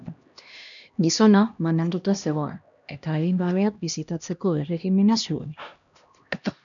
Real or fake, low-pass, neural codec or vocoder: fake; 7.2 kHz; codec, 16 kHz, 1 kbps, X-Codec, HuBERT features, trained on LibriSpeech